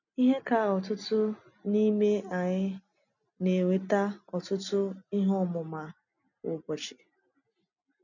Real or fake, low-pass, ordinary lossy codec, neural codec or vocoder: real; 7.2 kHz; none; none